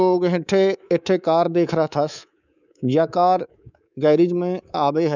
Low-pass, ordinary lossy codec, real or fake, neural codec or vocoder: 7.2 kHz; none; fake; codec, 24 kHz, 3.1 kbps, DualCodec